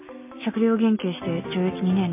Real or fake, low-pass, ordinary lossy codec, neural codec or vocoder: real; 3.6 kHz; MP3, 24 kbps; none